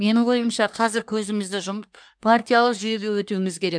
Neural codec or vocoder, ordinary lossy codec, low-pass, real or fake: codec, 24 kHz, 1 kbps, SNAC; MP3, 96 kbps; 9.9 kHz; fake